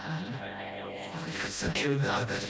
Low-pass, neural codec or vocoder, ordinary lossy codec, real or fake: none; codec, 16 kHz, 0.5 kbps, FreqCodec, smaller model; none; fake